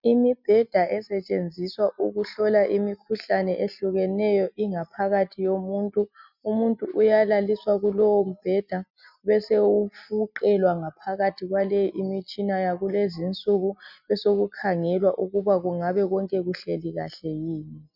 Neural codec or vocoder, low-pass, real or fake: none; 5.4 kHz; real